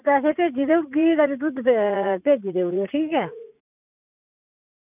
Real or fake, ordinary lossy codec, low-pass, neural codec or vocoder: fake; none; 3.6 kHz; vocoder, 44.1 kHz, 80 mel bands, Vocos